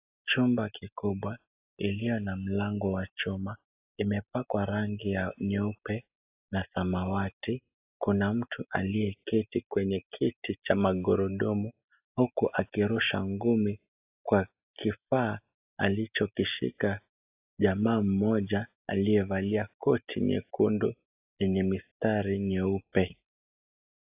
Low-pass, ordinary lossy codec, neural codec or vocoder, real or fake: 3.6 kHz; AAC, 32 kbps; none; real